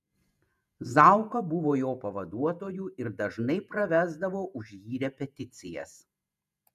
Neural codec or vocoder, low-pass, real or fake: vocoder, 48 kHz, 128 mel bands, Vocos; 14.4 kHz; fake